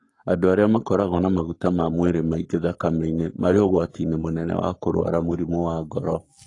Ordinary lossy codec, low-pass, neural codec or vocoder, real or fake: AAC, 32 kbps; 10.8 kHz; codec, 44.1 kHz, 7.8 kbps, Pupu-Codec; fake